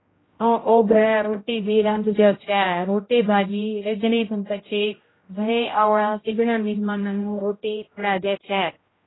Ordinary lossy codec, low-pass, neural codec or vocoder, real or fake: AAC, 16 kbps; 7.2 kHz; codec, 16 kHz, 0.5 kbps, X-Codec, HuBERT features, trained on general audio; fake